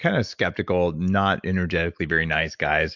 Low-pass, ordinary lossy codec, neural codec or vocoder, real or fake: 7.2 kHz; MP3, 64 kbps; none; real